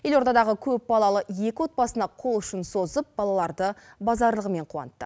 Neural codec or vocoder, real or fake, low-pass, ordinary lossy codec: none; real; none; none